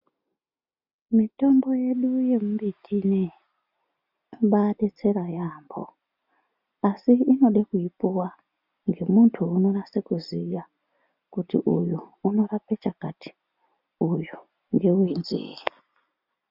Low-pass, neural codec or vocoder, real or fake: 5.4 kHz; none; real